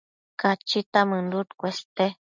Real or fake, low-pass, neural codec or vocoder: real; 7.2 kHz; none